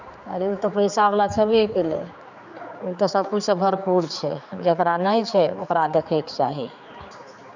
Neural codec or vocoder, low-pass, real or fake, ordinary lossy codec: codec, 16 kHz, 4 kbps, X-Codec, HuBERT features, trained on general audio; 7.2 kHz; fake; none